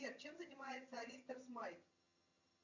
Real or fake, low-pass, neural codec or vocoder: fake; 7.2 kHz; vocoder, 22.05 kHz, 80 mel bands, HiFi-GAN